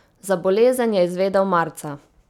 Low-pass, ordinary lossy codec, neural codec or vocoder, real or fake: 19.8 kHz; none; none; real